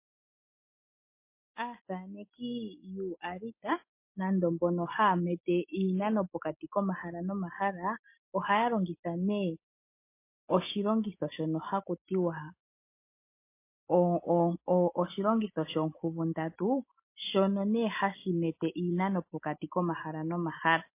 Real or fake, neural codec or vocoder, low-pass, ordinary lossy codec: real; none; 3.6 kHz; MP3, 24 kbps